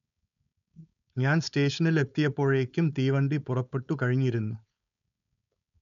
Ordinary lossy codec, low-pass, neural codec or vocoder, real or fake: none; 7.2 kHz; codec, 16 kHz, 4.8 kbps, FACodec; fake